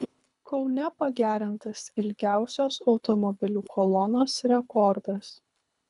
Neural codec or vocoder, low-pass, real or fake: codec, 24 kHz, 3 kbps, HILCodec; 10.8 kHz; fake